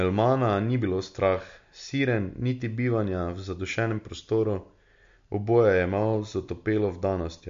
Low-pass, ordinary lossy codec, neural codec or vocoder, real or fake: 7.2 kHz; MP3, 48 kbps; none; real